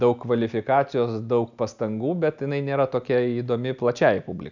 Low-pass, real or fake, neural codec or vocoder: 7.2 kHz; real; none